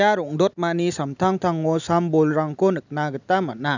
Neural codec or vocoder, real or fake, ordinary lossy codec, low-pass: none; real; none; 7.2 kHz